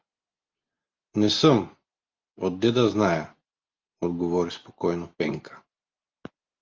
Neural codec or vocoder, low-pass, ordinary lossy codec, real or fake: none; 7.2 kHz; Opus, 32 kbps; real